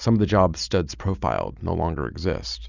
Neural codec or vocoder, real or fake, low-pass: none; real; 7.2 kHz